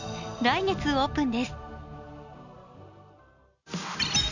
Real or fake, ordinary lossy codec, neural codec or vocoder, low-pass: real; none; none; 7.2 kHz